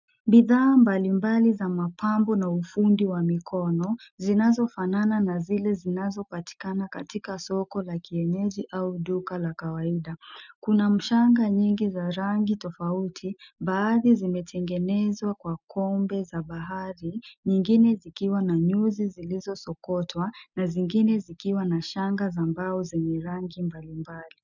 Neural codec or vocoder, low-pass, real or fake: none; 7.2 kHz; real